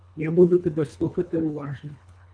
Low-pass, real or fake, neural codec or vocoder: 9.9 kHz; fake; codec, 24 kHz, 1.5 kbps, HILCodec